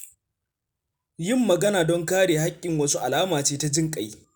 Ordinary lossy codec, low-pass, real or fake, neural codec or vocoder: none; none; real; none